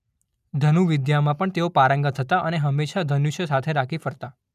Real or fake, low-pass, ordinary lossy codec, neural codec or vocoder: real; 14.4 kHz; none; none